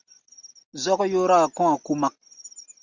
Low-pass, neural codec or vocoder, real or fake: 7.2 kHz; none; real